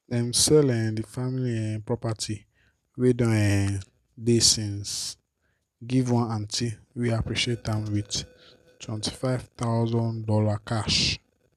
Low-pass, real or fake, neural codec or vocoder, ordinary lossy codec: 14.4 kHz; real; none; none